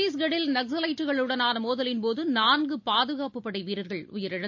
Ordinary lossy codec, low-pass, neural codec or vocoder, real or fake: AAC, 48 kbps; 7.2 kHz; none; real